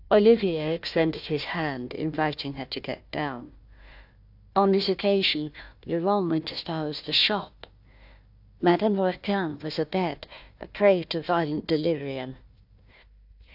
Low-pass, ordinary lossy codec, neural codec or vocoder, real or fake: 5.4 kHz; AAC, 48 kbps; codec, 16 kHz, 1 kbps, FunCodec, trained on Chinese and English, 50 frames a second; fake